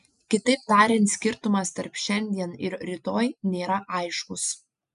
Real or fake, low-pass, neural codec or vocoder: real; 10.8 kHz; none